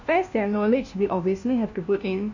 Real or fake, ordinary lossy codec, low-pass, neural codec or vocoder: fake; none; 7.2 kHz; codec, 16 kHz, 1 kbps, FunCodec, trained on LibriTTS, 50 frames a second